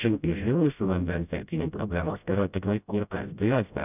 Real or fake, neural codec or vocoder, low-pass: fake; codec, 16 kHz, 0.5 kbps, FreqCodec, smaller model; 3.6 kHz